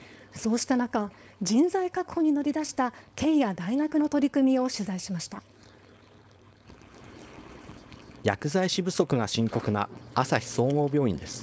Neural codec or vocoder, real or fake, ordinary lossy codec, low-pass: codec, 16 kHz, 4.8 kbps, FACodec; fake; none; none